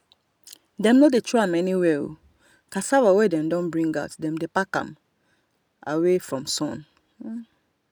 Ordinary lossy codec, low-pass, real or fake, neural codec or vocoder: none; none; real; none